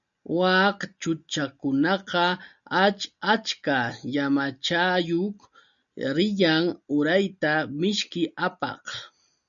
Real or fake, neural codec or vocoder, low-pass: real; none; 7.2 kHz